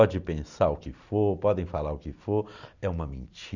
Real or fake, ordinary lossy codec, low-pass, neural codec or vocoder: real; none; 7.2 kHz; none